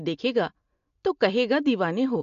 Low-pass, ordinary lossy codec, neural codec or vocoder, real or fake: 7.2 kHz; MP3, 48 kbps; none; real